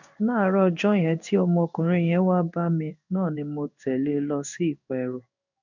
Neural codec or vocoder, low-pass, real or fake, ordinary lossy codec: codec, 16 kHz in and 24 kHz out, 1 kbps, XY-Tokenizer; 7.2 kHz; fake; none